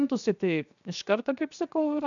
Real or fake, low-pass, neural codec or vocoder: fake; 7.2 kHz; codec, 16 kHz, 0.7 kbps, FocalCodec